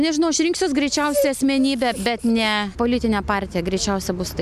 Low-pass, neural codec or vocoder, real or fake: 14.4 kHz; none; real